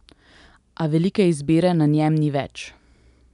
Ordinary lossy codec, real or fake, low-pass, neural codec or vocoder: none; real; 10.8 kHz; none